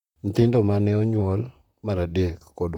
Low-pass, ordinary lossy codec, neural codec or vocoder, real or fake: 19.8 kHz; none; vocoder, 44.1 kHz, 128 mel bands, Pupu-Vocoder; fake